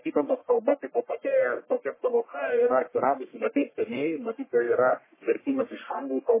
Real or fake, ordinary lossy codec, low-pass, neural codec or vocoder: fake; MP3, 16 kbps; 3.6 kHz; codec, 44.1 kHz, 1.7 kbps, Pupu-Codec